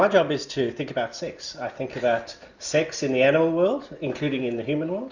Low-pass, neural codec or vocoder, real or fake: 7.2 kHz; none; real